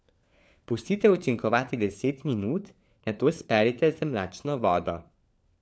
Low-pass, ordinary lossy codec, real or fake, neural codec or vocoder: none; none; fake; codec, 16 kHz, 4 kbps, FunCodec, trained on LibriTTS, 50 frames a second